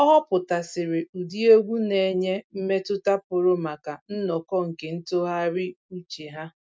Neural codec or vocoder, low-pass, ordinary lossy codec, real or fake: none; none; none; real